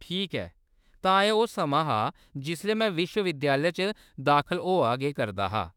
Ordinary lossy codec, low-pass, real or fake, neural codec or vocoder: none; 19.8 kHz; fake; autoencoder, 48 kHz, 32 numbers a frame, DAC-VAE, trained on Japanese speech